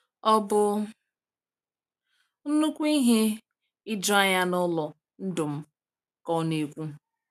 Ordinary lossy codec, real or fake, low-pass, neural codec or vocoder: none; real; 14.4 kHz; none